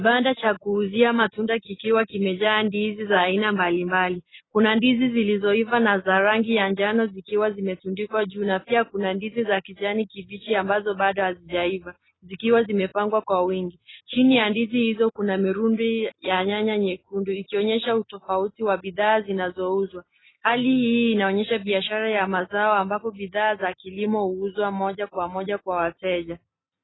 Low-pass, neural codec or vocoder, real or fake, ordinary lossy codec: 7.2 kHz; none; real; AAC, 16 kbps